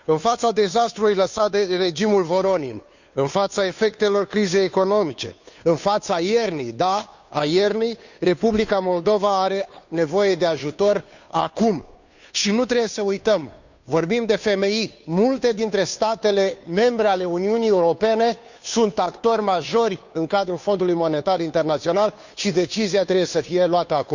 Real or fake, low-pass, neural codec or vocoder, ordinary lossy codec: fake; 7.2 kHz; codec, 16 kHz, 2 kbps, FunCodec, trained on Chinese and English, 25 frames a second; none